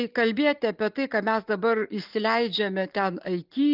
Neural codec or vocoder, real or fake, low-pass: none; real; 5.4 kHz